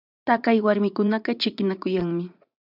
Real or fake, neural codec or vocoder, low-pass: fake; vocoder, 44.1 kHz, 80 mel bands, Vocos; 5.4 kHz